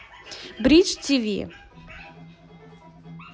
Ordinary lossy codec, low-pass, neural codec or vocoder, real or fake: none; none; none; real